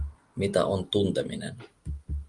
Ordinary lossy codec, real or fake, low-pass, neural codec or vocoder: Opus, 32 kbps; real; 10.8 kHz; none